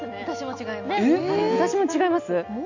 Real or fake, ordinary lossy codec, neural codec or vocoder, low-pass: real; none; none; 7.2 kHz